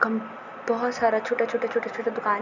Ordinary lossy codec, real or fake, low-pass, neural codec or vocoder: none; real; 7.2 kHz; none